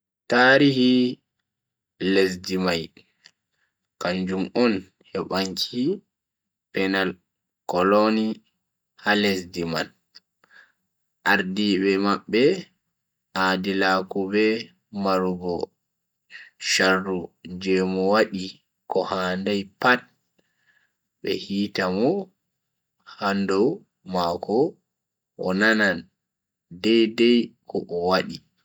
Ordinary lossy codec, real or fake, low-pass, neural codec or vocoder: none; real; none; none